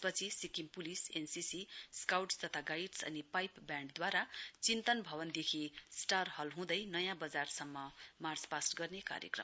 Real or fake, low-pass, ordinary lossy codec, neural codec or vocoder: real; none; none; none